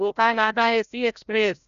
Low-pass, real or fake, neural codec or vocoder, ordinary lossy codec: 7.2 kHz; fake; codec, 16 kHz, 0.5 kbps, FreqCodec, larger model; none